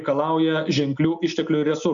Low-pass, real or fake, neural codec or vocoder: 7.2 kHz; real; none